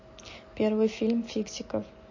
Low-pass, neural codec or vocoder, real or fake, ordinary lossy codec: 7.2 kHz; none; real; MP3, 32 kbps